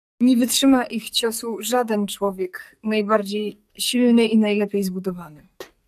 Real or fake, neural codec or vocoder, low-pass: fake; codec, 44.1 kHz, 2.6 kbps, SNAC; 14.4 kHz